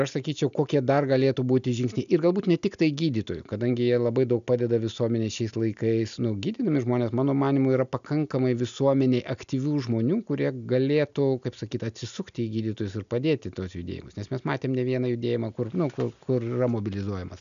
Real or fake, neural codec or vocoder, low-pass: real; none; 7.2 kHz